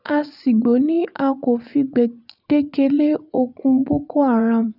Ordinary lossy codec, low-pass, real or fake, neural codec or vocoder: none; 5.4 kHz; fake; vocoder, 24 kHz, 100 mel bands, Vocos